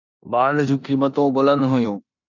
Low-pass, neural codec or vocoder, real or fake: 7.2 kHz; codec, 16 kHz in and 24 kHz out, 0.9 kbps, LongCat-Audio-Codec, four codebook decoder; fake